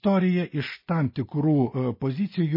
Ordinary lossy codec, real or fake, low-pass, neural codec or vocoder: MP3, 24 kbps; real; 5.4 kHz; none